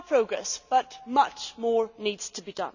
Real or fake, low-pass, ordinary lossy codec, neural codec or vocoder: real; 7.2 kHz; none; none